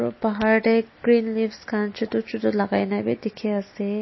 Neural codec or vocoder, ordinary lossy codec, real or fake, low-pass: none; MP3, 24 kbps; real; 7.2 kHz